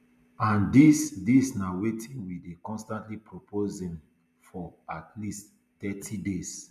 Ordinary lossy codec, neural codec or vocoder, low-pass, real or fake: none; none; 14.4 kHz; real